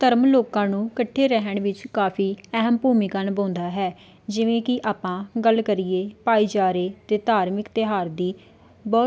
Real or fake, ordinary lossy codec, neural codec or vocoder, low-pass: real; none; none; none